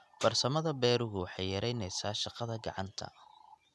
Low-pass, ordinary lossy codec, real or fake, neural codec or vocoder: none; none; real; none